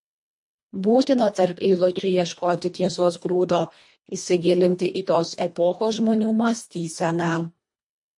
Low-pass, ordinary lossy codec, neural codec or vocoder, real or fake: 10.8 kHz; MP3, 48 kbps; codec, 24 kHz, 1.5 kbps, HILCodec; fake